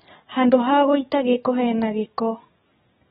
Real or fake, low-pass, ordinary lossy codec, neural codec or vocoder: fake; 19.8 kHz; AAC, 16 kbps; codec, 44.1 kHz, 7.8 kbps, DAC